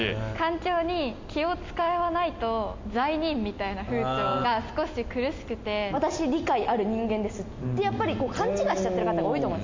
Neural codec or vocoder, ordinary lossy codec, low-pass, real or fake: none; MP3, 48 kbps; 7.2 kHz; real